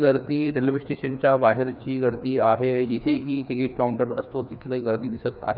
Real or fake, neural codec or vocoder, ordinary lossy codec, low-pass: fake; codec, 16 kHz, 2 kbps, FreqCodec, larger model; none; 5.4 kHz